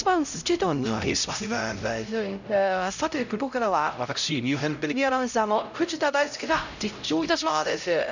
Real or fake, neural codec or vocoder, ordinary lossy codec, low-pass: fake; codec, 16 kHz, 0.5 kbps, X-Codec, HuBERT features, trained on LibriSpeech; none; 7.2 kHz